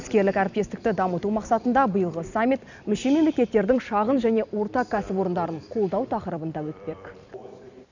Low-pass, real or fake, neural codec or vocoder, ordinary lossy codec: 7.2 kHz; fake; vocoder, 44.1 kHz, 128 mel bands every 256 samples, BigVGAN v2; none